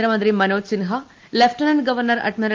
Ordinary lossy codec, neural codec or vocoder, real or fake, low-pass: Opus, 24 kbps; none; real; 7.2 kHz